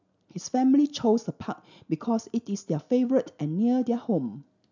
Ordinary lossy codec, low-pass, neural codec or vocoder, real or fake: none; 7.2 kHz; none; real